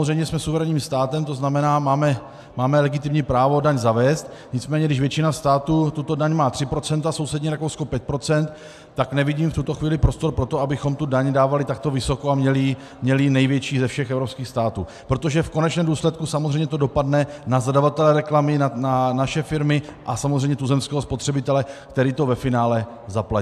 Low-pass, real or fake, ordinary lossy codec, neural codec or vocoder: 14.4 kHz; real; AAC, 96 kbps; none